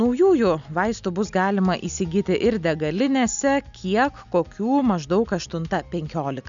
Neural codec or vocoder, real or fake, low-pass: none; real; 7.2 kHz